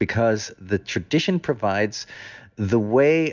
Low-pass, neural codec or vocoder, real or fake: 7.2 kHz; none; real